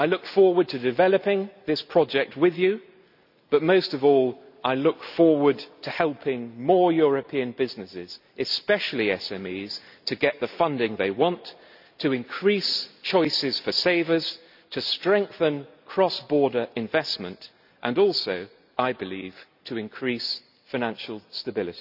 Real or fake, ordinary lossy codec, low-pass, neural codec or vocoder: real; none; 5.4 kHz; none